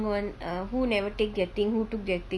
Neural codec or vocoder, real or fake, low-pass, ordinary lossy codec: none; real; none; none